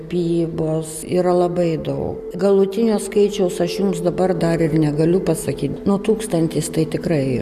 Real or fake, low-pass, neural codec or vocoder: real; 14.4 kHz; none